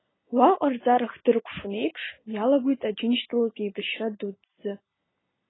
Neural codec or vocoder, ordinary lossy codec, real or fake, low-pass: none; AAC, 16 kbps; real; 7.2 kHz